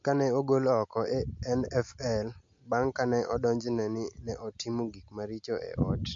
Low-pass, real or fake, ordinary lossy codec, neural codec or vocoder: 7.2 kHz; real; MP3, 48 kbps; none